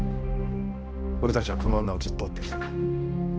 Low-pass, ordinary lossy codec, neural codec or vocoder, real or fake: none; none; codec, 16 kHz, 1 kbps, X-Codec, HuBERT features, trained on balanced general audio; fake